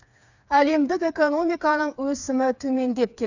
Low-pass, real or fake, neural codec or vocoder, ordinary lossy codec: 7.2 kHz; fake; codec, 16 kHz, 4 kbps, FreqCodec, smaller model; none